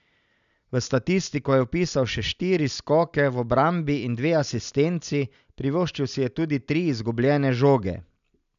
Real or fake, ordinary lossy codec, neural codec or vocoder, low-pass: real; none; none; 7.2 kHz